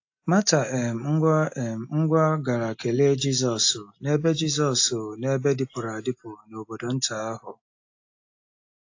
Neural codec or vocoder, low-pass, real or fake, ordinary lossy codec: none; 7.2 kHz; real; AAC, 48 kbps